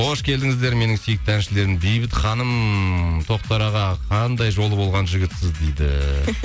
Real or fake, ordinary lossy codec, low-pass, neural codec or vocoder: real; none; none; none